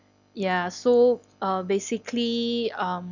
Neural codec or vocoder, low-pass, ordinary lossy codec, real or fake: none; 7.2 kHz; none; real